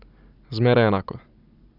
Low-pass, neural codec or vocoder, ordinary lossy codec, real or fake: 5.4 kHz; none; none; real